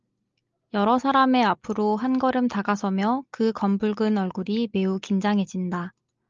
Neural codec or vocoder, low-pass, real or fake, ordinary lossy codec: none; 7.2 kHz; real; Opus, 24 kbps